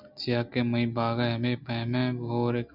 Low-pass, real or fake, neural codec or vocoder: 5.4 kHz; real; none